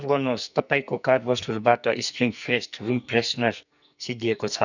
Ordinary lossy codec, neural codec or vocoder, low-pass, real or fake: none; codec, 44.1 kHz, 2.6 kbps, SNAC; 7.2 kHz; fake